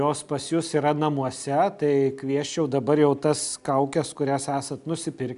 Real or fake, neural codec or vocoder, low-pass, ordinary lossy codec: real; none; 10.8 kHz; MP3, 96 kbps